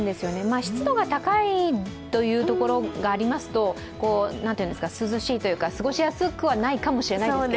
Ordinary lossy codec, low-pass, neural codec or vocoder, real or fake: none; none; none; real